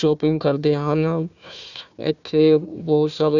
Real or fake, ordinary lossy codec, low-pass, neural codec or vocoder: fake; none; 7.2 kHz; codec, 16 kHz, 1 kbps, FunCodec, trained on Chinese and English, 50 frames a second